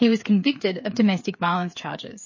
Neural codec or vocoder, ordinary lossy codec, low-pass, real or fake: codec, 16 kHz, 8 kbps, FreqCodec, smaller model; MP3, 32 kbps; 7.2 kHz; fake